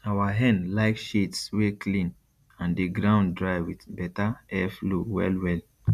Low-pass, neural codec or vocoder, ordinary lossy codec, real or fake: 14.4 kHz; none; none; real